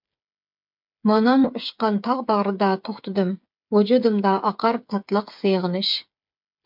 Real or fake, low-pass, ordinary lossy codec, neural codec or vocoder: fake; 5.4 kHz; MP3, 48 kbps; codec, 16 kHz, 8 kbps, FreqCodec, smaller model